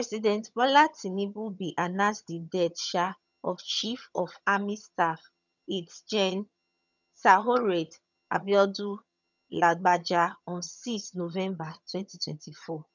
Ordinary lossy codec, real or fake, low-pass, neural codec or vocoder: none; fake; 7.2 kHz; vocoder, 22.05 kHz, 80 mel bands, HiFi-GAN